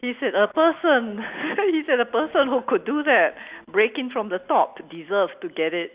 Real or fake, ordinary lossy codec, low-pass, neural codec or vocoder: real; Opus, 64 kbps; 3.6 kHz; none